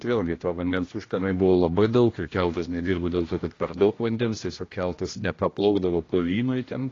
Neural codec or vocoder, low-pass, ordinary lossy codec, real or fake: codec, 16 kHz, 1 kbps, X-Codec, HuBERT features, trained on general audio; 7.2 kHz; AAC, 32 kbps; fake